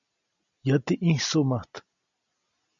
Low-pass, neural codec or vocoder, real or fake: 7.2 kHz; none; real